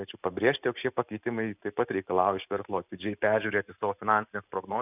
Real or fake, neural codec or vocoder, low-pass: real; none; 3.6 kHz